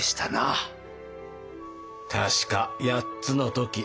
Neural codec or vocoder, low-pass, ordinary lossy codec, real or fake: none; none; none; real